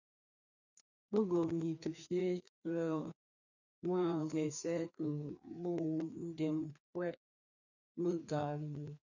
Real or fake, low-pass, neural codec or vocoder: fake; 7.2 kHz; codec, 16 kHz, 2 kbps, FreqCodec, larger model